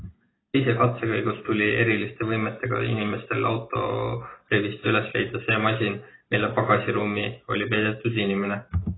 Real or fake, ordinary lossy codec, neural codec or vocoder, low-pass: real; AAC, 16 kbps; none; 7.2 kHz